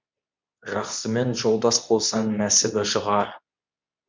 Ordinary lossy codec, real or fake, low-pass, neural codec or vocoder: MP3, 64 kbps; fake; 7.2 kHz; codec, 24 kHz, 0.9 kbps, WavTokenizer, medium speech release version 2